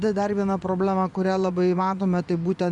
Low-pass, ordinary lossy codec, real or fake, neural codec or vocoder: 10.8 kHz; Opus, 64 kbps; fake; vocoder, 24 kHz, 100 mel bands, Vocos